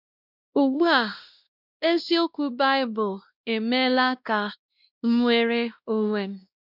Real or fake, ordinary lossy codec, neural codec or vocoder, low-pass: fake; none; codec, 16 kHz, 1 kbps, X-Codec, WavLM features, trained on Multilingual LibriSpeech; 5.4 kHz